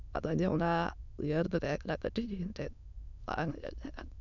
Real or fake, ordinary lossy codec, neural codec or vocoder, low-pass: fake; none; autoencoder, 22.05 kHz, a latent of 192 numbers a frame, VITS, trained on many speakers; 7.2 kHz